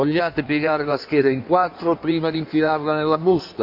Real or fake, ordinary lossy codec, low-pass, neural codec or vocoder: fake; MP3, 48 kbps; 5.4 kHz; codec, 16 kHz in and 24 kHz out, 1.1 kbps, FireRedTTS-2 codec